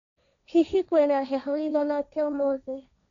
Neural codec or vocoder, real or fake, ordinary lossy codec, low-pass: codec, 16 kHz, 1.1 kbps, Voila-Tokenizer; fake; none; 7.2 kHz